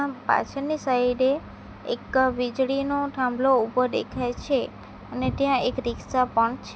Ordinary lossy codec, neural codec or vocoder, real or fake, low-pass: none; none; real; none